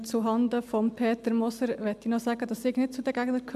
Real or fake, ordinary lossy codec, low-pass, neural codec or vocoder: real; AAC, 96 kbps; 14.4 kHz; none